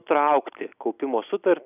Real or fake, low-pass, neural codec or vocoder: real; 3.6 kHz; none